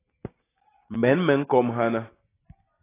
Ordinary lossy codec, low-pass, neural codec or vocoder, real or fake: AAC, 16 kbps; 3.6 kHz; none; real